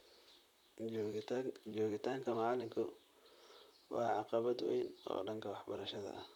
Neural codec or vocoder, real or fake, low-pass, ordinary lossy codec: vocoder, 44.1 kHz, 128 mel bands, Pupu-Vocoder; fake; 19.8 kHz; none